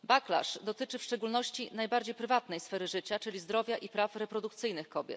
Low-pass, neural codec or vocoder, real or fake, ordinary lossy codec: none; none; real; none